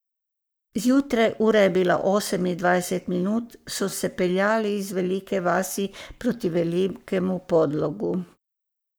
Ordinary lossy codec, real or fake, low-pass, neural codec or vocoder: none; fake; none; codec, 44.1 kHz, 7.8 kbps, Pupu-Codec